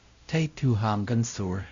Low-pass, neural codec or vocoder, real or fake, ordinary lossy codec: 7.2 kHz; codec, 16 kHz, 0.5 kbps, X-Codec, WavLM features, trained on Multilingual LibriSpeech; fake; AAC, 64 kbps